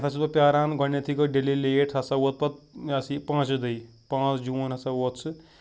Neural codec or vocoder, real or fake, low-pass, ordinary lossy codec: none; real; none; none